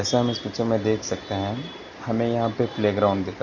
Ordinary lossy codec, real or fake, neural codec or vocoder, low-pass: none; real; none; 7.2 kHz